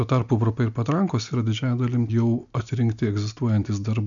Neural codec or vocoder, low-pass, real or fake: none; 7.2 kHz; real